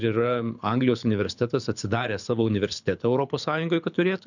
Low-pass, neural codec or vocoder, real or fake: 7.2 kHz; codec, 24 kHz, 6 kbps, HILCodec; fake